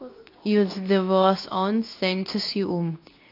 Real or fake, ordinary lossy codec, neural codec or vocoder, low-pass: fake; AAC, 32 kbps; codec, 24 kHz, 0.9 kbps, WavTokenizer, medium speech release version 2; 5.4 kHz